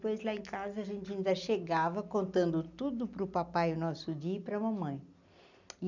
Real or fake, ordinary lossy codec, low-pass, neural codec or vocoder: real; none; 7.2 kHz; none